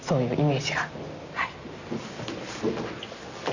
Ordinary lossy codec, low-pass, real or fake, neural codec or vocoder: none; 7.2 kHz; real; none